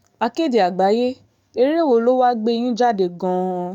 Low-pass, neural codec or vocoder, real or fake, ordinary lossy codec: 19.8 kHz; codec, 44.1 kHz, 7.8 kbps, DAC; fake; none